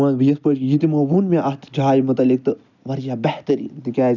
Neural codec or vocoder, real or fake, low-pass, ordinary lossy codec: none; real; 7.2 kHz; none